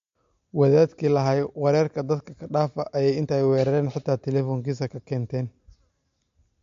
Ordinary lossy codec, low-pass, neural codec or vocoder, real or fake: MP3, 48 kbps; 7.2 kHz; none; real